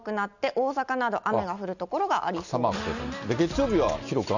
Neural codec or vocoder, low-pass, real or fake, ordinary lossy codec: none; 7.2 kHz; real; none